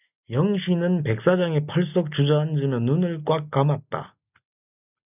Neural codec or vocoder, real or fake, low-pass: none; real; 3.6 kHz